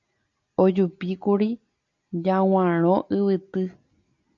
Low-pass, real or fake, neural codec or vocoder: 7.2 kHz; real; none